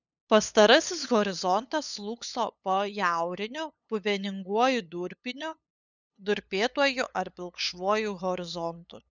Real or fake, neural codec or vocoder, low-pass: fake; codec, 16 kHz, 8 kbps, FunCodec, trained on LibriTTS, 25 frames a second; 7.2 kHz